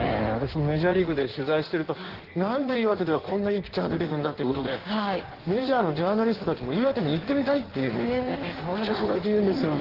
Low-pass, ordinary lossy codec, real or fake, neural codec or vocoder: 5.4 kHz; Opus, 16 kbps; fake; codec, 16 kHz in and 24 kHz out, 1.1 kbps, FireRedTTS-2 codec